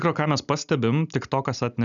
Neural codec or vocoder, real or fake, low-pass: none; real; 7.2 kHz